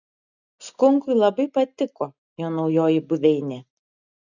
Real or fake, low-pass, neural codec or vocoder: real; 7.2 kHz; none